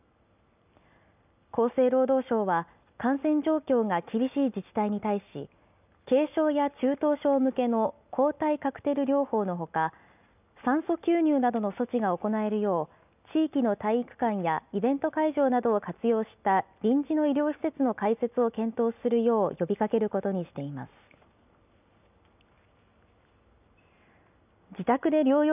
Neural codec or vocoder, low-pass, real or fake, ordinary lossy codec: none; 3.6 kHz; real; none